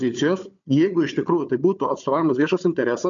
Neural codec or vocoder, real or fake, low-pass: codec, 16 kHz, 4 kbps, FunCodec, trained on Chinese and English, 50 frames a second; fake; 7.2 kHz